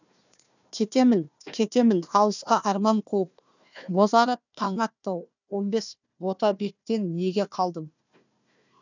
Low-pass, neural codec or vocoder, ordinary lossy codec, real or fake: 7.2 kHz; codec, 16 kHz, 1 kbps, FunCodec, trained on Chinese and English, 50 frames a second; none; fake